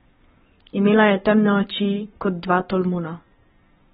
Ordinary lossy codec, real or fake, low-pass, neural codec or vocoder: AAC, 16 kbps; fake; 19.8 kHz; codec, 44.1 kHz, 7.8 kbps, Pupu-Codec